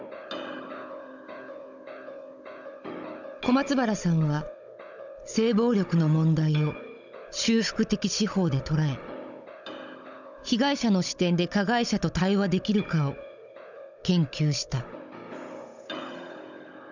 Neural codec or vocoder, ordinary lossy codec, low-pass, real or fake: codec, 16 kHz, 16 kbps, FunCodec, trained on Chinese and English, 50 frames a second; none; 7.2 kHz; fake